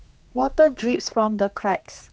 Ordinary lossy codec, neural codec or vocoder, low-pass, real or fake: none; codec, 16 kHz, 2 kbps, X-Codec, HuBERT features, trained on general audio; none; fake